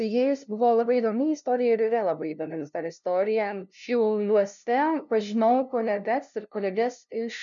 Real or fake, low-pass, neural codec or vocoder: fake; 7.2 kHz; codec, 16 kHz, 0.5 kbps, FunCodec, trained on LibriTTS, 25 frames a second